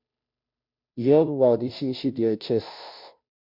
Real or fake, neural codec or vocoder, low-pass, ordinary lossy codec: fake; codec, 16 kHz, 0.5 kbps, FunCodec, trained on Chinese and English, 25 frames a second; 5.4 kHz; MP3, 48 kbps